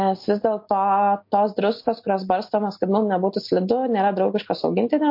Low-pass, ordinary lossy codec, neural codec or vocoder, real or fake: 5.4 kHz; MP3, 32 kbps; none; real